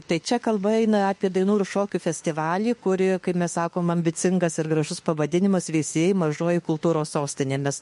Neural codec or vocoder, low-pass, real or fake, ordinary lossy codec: autoencoder, 48 kHz, 32 numbers a frame, DAC-VAE, trained on Japanese speech; 14.4 kHz; fake; MP3, 48 kbps